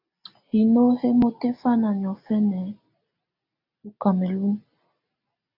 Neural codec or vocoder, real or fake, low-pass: none; real; 5.4 kHz